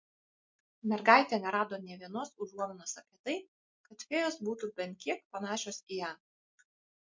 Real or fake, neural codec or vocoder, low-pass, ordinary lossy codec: real; none; 7.2 kHz; MP3, 48 kbps